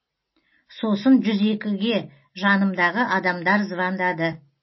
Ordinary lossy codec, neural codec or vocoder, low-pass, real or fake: MP3, 24 kbps; none; 7.2 kHz; real